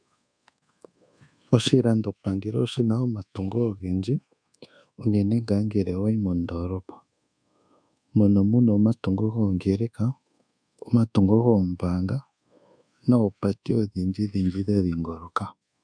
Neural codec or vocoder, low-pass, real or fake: codec, 24 kHz, 1.2 kbps, DualCodec; 9.9 kHz; fake